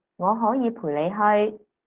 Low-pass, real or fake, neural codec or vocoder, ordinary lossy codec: 3.6 kHz; real; none; Opus, 16 kbps